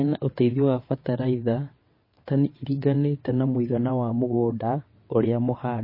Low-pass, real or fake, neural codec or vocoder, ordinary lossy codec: 5.4 kHz; fake; vocoder, 22.05 kHz, 80 mel bands, WaveNeXt; MP3, 24 kbps